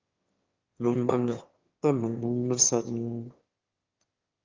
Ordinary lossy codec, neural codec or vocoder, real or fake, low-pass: Opus, 24 kbps; autoencoder, 22.05 kHz, a latent of 192 numbers a frame, VITS, trained on one speaker; fake; 7.2 kHz